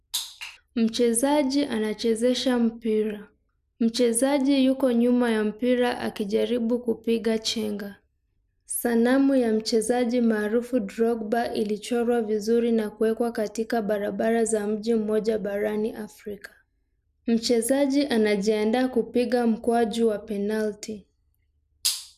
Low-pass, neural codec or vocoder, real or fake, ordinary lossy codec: 14.4 kHz; none; real; none